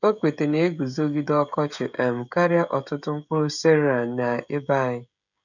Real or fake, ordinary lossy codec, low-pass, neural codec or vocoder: fake; none; 7.2 kHz; codec, 16 kHz, 16 kbps, FreqCodec, smaller model